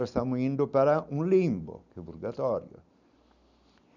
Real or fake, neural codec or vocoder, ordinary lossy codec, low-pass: real; none; none; 7.2 kHz